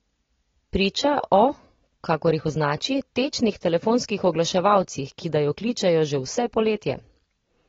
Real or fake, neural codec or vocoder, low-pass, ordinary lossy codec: real; none; 7.2 kHz; AAC, 24 kbps